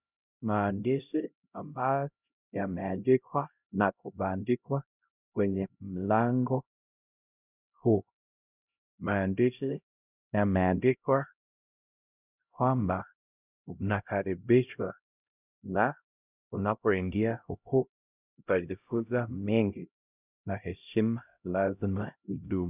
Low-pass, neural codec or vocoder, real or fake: 3.6 kHz; codec, 16 kHz, 0.5 kbps, X-Codec, HuBERT features, trained on LibriSpeech; fake